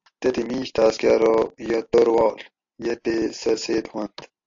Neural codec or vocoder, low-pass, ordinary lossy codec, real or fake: none; 7.2 kHz; AAC, 48 kbps; real